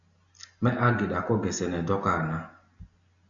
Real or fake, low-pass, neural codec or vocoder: real; 7.2 kHz; none